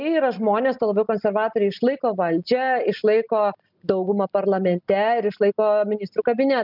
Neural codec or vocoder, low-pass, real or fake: none; 5.4 kHz; real